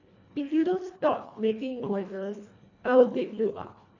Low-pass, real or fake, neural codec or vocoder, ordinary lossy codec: 7.2 kHz; fake; codec, 24 kHz, 1.5 kbps, HILCodec; none